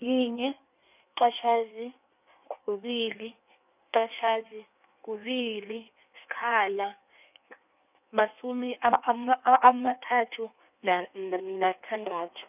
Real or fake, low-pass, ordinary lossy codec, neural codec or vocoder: fake; 3.6 kHz; none; codec, 16 kHz in and 24 kHz out, 1.1 kbps, FireRedTTS-2 codec